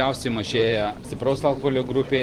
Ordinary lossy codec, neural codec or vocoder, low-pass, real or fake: Opus, 24 kbps; vocoder, 44.1 kHz, 128 mel bands every 512 samples, BigVGAN v2; 19.8 kHz; fake